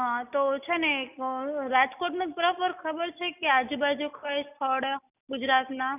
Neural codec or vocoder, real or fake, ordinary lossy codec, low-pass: none; real; none; 3.6 kHz